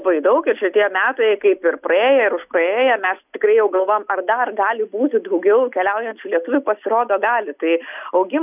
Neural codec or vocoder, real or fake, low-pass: none; real; 3.6 kHz